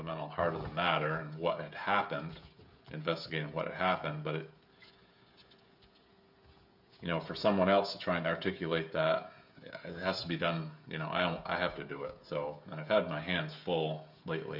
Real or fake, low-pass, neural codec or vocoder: fake; 5.4 kHz; codec, 16 kHz, 16 kbps, FreqCodec, smaller model